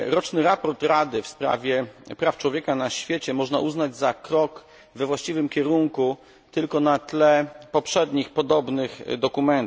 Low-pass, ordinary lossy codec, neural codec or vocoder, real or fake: none; none; none; real